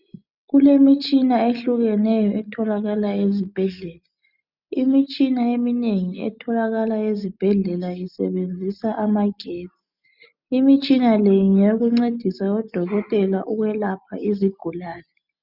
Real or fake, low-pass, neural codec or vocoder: real; 5.4 kHz; none